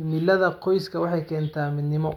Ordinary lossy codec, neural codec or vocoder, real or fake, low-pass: none; none; real; 19.8 kHz